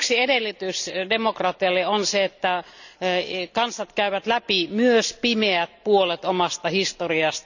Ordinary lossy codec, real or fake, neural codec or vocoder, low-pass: none; real; none; 7.2 kHz